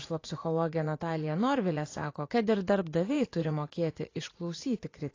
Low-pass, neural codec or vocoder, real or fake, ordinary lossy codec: 7.2 kHz; vocoder, 44.1 kHz, 80 mel bands, Vocos; fake; AAC, 32 kbps